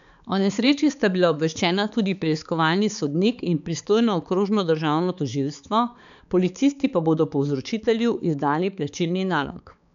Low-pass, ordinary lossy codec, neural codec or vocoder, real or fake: 7.2 kHz; none; codec, 16 kHz, 4 kbps, X-Codec, HuBERT features, trained on balanced general audio; fake